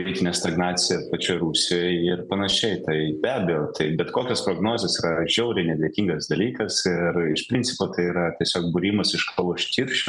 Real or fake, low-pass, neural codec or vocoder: real; 10.8 kHz; none